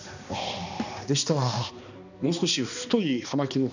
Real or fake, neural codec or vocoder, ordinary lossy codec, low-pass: fake; codec, 16 kHz, 2 kbps, X-Codec, HuBERT features, trained on balanced general audio; none; 7.2 kHz